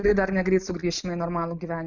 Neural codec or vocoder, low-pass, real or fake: none; 7.2 kHz; real